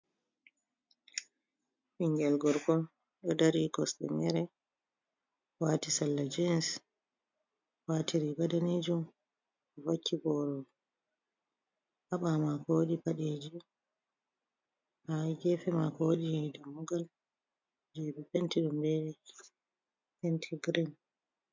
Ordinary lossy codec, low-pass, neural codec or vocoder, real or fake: MP3, 64 kbps; 7.2 kHz; none; real